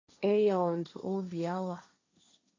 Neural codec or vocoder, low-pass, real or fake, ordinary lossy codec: codec, 16 kHz, 1.1 kbps, Voila-Tokenizer; 7.2 kHz; fake; AAC, 32 kbps